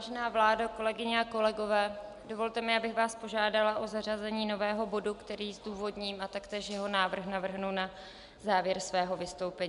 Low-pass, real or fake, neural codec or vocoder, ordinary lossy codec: 10.8 kHz; real; none; MP3, 96 kbps